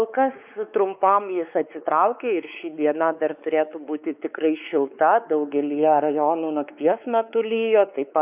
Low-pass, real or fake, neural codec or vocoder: 3.6 kHz; fake; codec, 16 kHz, 4 kbps, X-Codec, WavLM features, trained on Multilingual LibriSpeech